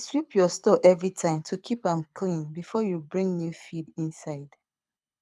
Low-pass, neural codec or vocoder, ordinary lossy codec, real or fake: none; codec, 24 kHz, 6 kbps, HILCodec; none; fake